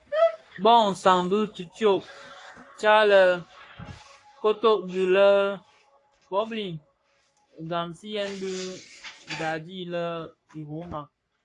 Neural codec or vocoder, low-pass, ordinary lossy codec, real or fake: codec, 44.1 kHz, 3.4 kbps, Pupu-Codec; 10.8 kHz; AAC, 48 kbps; fake